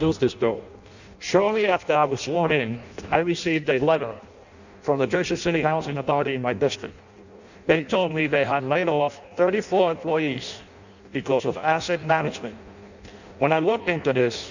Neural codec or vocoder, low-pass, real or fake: codec, 16 kHz in and 24 kHz out, 0.6 kbps, FireRedTTS-2 codec; 7.2 kHz; fake